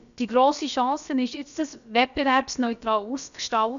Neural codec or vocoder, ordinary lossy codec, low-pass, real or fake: codec, 16 kHz, about 1 kbps, DyCAST, with the encoder's durations; none; 7.2 kHz; fake